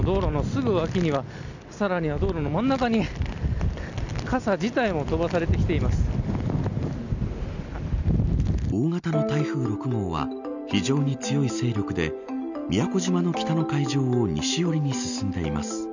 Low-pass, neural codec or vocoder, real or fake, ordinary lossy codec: 7.2 kHz; none; real; none